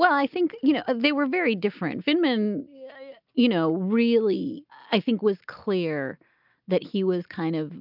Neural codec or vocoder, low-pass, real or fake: none; 5.4 kHz; real